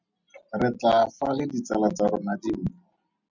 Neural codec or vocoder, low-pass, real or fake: none; 7.2 kHz; real